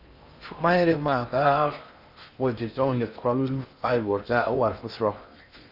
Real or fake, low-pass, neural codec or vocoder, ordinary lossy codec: fake; 5.4 kHz; codec, 16 kHz in and 24 kHz out, 0.6 kbps, FocalCodec, streaming, 4096 codes; Opus, 64 kbps